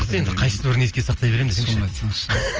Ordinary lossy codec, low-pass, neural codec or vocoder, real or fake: Opus, 24 kbps; 7.2 kHz; none; real